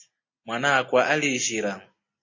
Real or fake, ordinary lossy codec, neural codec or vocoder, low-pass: real; MP3, 32 kbps; none; 7.2 kHz